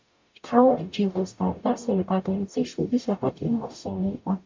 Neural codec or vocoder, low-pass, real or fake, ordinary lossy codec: codec, 44.1 kHz, 0.9 kbps, DAC; 7.2 kHz; fake; MP3, 48 kbps